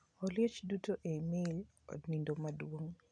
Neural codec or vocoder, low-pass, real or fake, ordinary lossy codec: none; 9.9 kHz; real; none